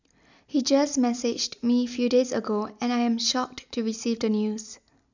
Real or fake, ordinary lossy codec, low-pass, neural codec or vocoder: real; none; 7.2 kHz; none